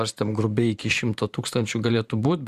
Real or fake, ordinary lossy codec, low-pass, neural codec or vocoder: fake; Opus, 64 kbps; 14.4 kHz; vocoder, 44.1 kHz, 128 mel bands every 512 samples, BigVGAN v2